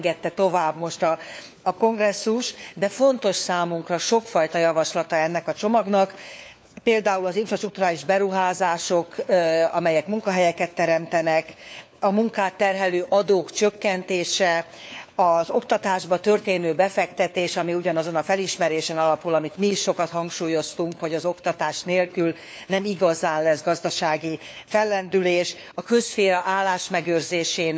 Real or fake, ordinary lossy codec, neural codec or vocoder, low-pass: fake; none; codec, 16 kHz, 4 kbps, FunCodec, trained on LibriTTS, 50 frames a second; none